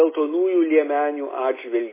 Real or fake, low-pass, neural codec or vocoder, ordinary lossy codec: real; 3.6 kHz; none; MP3, 16 kbps